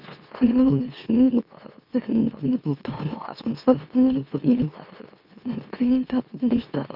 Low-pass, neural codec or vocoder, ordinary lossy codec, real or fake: 5.4 kHz; autoencoder, 44.1 kHz, a latent of 192 numbers a frame, MeloTTS; none; fake